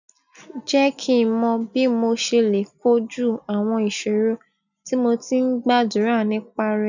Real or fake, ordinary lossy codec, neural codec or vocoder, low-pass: real; none; none; 7.2 kHz